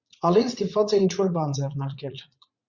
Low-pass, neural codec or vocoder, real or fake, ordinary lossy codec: 7.2 kHz; vocoder, 44.1 kHz, 80 mel bands, Vocos; fake; Opus, 64 kbps